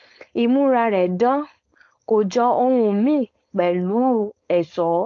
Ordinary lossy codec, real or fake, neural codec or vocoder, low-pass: AAC, 48 kbps; fake; codec, 16 kHz, 4.8 kbps, FACodec; 7.2 kHz